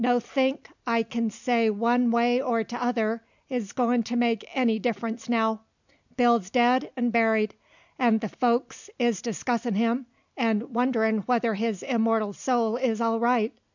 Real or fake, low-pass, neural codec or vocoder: real; 7.2 kHz; none